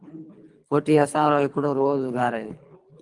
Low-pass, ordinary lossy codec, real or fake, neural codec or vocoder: 10.8 kHz; Opus, 32 kbps; fake; codec, 24 kHz, 3 kbps, HILCodec